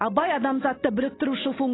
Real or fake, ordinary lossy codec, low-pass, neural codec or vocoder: real; AAC, 16 kbps; 7.2 kHz; none